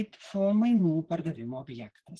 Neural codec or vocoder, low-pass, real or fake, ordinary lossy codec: vocoder, 44.1 kHz, 128 mel bands, Pupu-Vocoder; 10.8 kHz; fake; Opus, 16 kbps